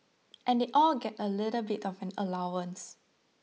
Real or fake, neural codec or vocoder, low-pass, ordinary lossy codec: real; none; none; none